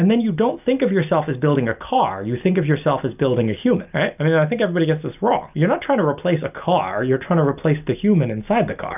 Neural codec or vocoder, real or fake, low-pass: none; real; 3.6 kHz